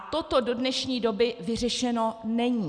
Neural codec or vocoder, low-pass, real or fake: none; 9.9 kHz; real